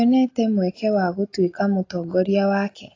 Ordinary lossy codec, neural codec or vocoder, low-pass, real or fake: AAC, 32 kbps; none; 7.2 kHz; real